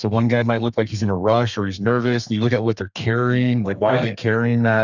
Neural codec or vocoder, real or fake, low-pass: codec, 32 kHz, 1.9 kbps, SNAC; fake; 7.2 kHz